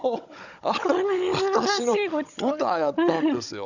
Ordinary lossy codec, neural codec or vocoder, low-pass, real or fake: none; codec, 16 kHz, 16 kbps, FunCodec, trained on Chinese and English, 50 frames a second; 7.2 kHz; fake